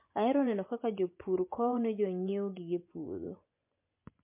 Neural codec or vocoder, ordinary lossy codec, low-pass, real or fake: vocoder, 24 kHz, 100 mel bands, Vocos; MP3, 24 kbps; 3.6 kHz; fake